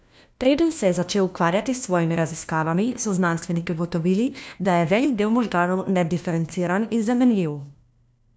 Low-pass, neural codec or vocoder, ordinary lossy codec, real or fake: none; codec, 16 kHz, 1 kbps, FunCodec, trained on LibriTTS, 50 frames a second; none; fake